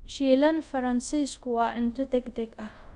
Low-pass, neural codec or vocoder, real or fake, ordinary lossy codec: 10.8 kHz; codec, 24 kHz, 0.5 kbps, DualCodec; fake; none